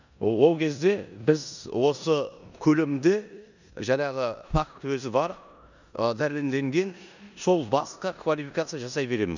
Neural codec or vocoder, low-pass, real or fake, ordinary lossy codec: codec, 16 kHz in and 24 kHz out, 0.9 kbps, LongCat-Audio-Codec, four codebook decoder; 7.2 kHz; fake; none